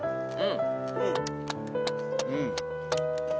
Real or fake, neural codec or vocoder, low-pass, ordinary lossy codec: real; none; none; none